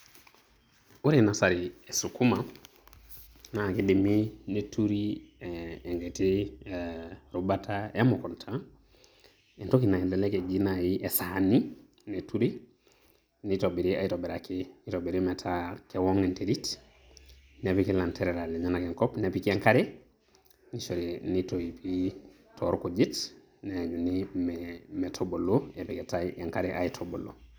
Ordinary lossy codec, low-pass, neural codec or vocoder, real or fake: none; none; none; real